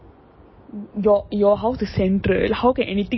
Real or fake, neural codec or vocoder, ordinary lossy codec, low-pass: real; none; MP3, 24 kbps; 7.2 kHz